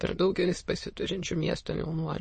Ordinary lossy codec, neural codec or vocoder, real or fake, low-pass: MP3, 32 kbps; autoencoder, 22.05 kHz, a latent of 192 numbers a frame, VITS, trained on many speakers; fake; 9.9 kHz